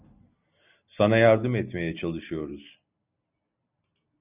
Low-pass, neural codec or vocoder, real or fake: 3.6 kHz; none; real